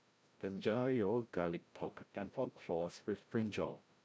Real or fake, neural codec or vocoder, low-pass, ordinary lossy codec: fake; codec, 16 kHz, 0.5 kbps, FreqCodec, larger model; none; none